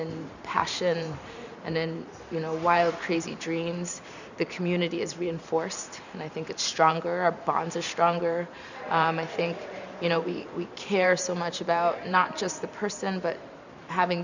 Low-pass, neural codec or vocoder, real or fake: 7.2 kHz; vocoder, 44.1 kHz, 128 mel bands every 512 samples, BigVGAN v2; fake